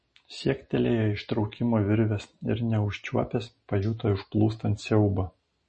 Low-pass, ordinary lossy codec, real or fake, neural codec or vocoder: 10.8 kHz; MP3, 32 kbps; real; none